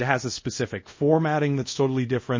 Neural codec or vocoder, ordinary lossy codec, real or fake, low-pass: codec, 24 kHz, 0.5 kbps, DualCodec; MP3, 32 kbps; fake; 7.2 kHz